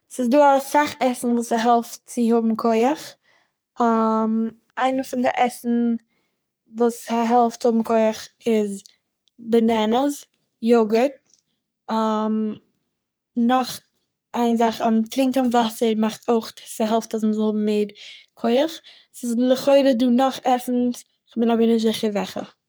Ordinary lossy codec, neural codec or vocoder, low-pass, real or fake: none; codec, 44.1 kHz, 3.4 kbps, Pupu-Codec; none; fake